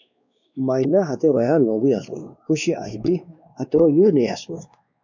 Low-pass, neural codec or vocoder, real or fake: 7.2 kHz; codec, 16 kHz, 2 kbps, X-Codec, WavLM features, trained on Multilingual LibriSpeech; fake